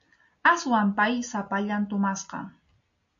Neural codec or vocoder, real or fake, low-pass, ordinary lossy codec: none; real; 7.2 kHz; MP3, 96 kbps